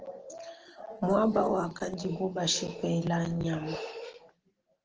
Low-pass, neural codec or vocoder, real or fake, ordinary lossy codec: 7.2 kHz; none; real; Opus, 16 kbps